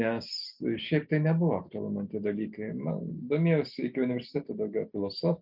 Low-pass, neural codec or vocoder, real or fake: 5.4 kHz; none; real